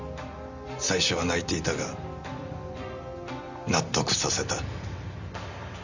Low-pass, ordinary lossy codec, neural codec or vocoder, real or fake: 7.2 kHz; Opus, 64 kbps; none; real